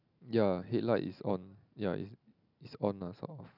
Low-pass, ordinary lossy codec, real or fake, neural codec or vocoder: 5.4 kHz; none; real; none